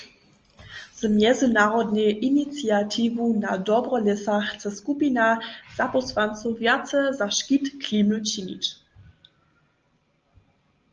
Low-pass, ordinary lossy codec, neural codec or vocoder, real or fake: 7.2 kHz; Opus, 24 kbps; none; real